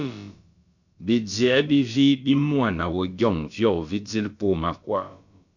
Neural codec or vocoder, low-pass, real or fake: codec, 16 kHz, about 1 kbps, DyCAST, with the encoder's durations; 7.2 kHz; fake